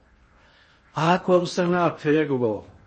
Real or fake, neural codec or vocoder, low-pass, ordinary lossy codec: fake; codec, 16 kHz in and 24 kHz out, 0.6 kbps, FocalCodec, streaming, 4096 codes; 10.8 kHz; MP3, 32 kbps